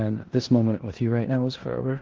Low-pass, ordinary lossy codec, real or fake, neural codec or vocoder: 7.2 kHz; Opus, 16 kbps; fake; codec, 16 kHz in and 24 kHz out, 0.9 kbps, LongCat-Audio-Codec, four codebook decoder